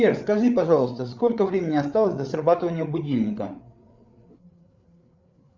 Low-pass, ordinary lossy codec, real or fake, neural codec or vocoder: 7.2 kHz; Opus, 64 kbps; fake; codec, 16 kHz, 8 kbps, FreqCodec, larger model